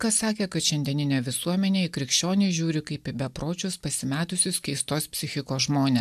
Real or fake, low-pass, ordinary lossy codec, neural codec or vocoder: real; 14.4 kHz; AAC, 96 kbps; none